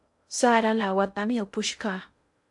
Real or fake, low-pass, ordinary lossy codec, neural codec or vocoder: fake; 10.8 kHz; AAC, 64 kbps; codec, 16 kHz in and 24 kHz out, 0.6 kbps, FocalCodec, streaming, 2048 codes